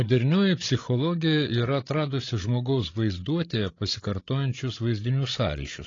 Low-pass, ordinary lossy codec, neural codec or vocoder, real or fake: 7.2 kHz; AAC, 32 kbps; codec, 16 kHz, 16 kbps, FreqCodec, larger model; fake